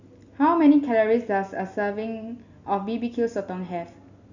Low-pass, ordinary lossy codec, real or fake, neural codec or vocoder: 7.2 kHz; none; real; none